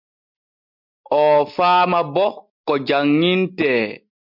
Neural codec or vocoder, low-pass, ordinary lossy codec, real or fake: none; 5.4 kHz; MP3, 48 kbps; real